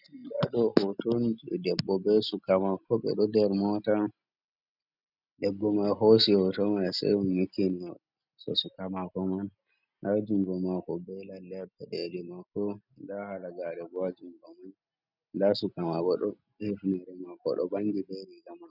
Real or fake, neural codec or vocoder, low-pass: real; none; 5.4 kHz